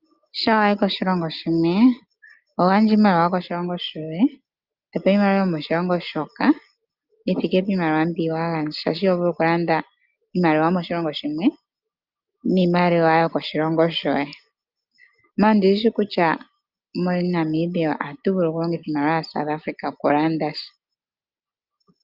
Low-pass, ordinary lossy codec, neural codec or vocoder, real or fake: 5.4 kHz; Opus, 24 kbps; none; real